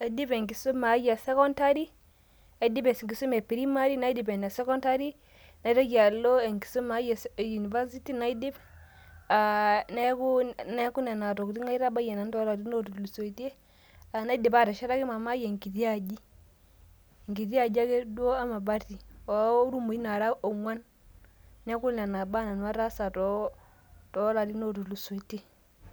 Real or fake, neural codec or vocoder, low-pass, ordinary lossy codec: real; none; none; none